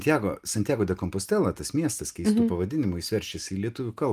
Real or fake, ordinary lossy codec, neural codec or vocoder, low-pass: real; Opus, 32 kbps; none; 14.4 kHz